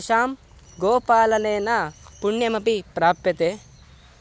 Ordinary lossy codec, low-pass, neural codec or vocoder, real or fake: none; none; none; real